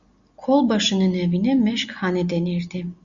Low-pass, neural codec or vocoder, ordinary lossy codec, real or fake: 7.2 kHz; none; Opus, 64 kbps; real